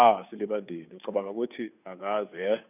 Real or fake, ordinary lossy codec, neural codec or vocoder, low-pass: fake; none; codec, 16 kHz, 2 kbps, FunCodec, trained on Chinese and English, 25 frames a second; 3.6 kHz